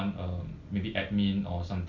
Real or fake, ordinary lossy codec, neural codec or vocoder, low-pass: real; Opus, 64 kbps; none; 7.2 kHz